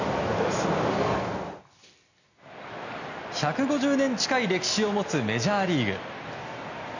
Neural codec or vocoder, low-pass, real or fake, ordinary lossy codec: none; 7.2 kHz; real; none